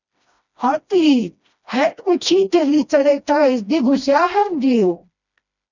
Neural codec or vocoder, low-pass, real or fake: codec, 16 kHz, 1 kbps, FreqCodec, smaller model; 7.2 kHz; fake